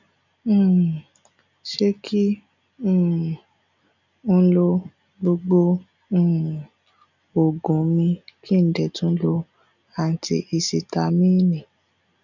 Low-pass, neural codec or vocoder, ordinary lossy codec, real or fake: 7.2 kHz; none; none; real